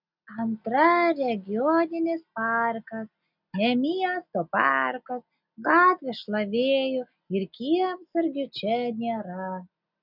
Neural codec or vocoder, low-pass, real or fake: none; 5.4 kHz; real